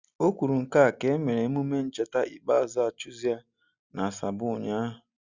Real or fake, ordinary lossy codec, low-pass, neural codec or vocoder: real; Opus, 64 kbps; 7.2 kHz; none